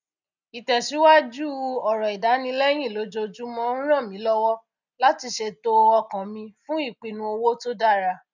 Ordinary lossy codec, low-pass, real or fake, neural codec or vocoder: none; 7.2 kHz; real; none